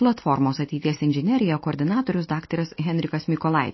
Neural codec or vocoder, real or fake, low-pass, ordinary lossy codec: none; real; 7.2 kHz; MP3, 24 kbps